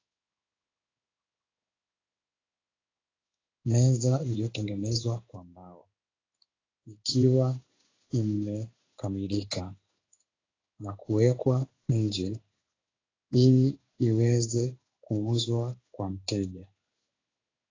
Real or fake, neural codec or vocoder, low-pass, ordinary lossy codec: fake; codec, 16 kHz in and 24 kHz out, 1 kbps, XY-Tokenizer; 7.2 kHz; AAC, 32 kbps